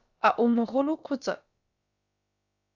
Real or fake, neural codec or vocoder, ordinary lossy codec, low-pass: fake; codec, 16 kHz, about 1 kbps, DyCAST, with the encoder's durations; Opus, 64 kbps; 7.2 kHz